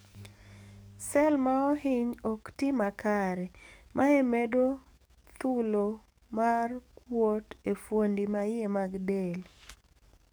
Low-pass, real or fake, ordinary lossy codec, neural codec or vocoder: none; fake; none; codec, 44.1 kHz, 7.8 kbps, DAC